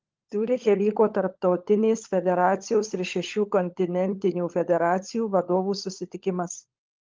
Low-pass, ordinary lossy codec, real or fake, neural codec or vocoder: 7.2 kHz; Opus, 32 kbps; fake; codec, 16 kHz, 16 kbps, FunCodec, trained on LibriTTS, 50 frames a second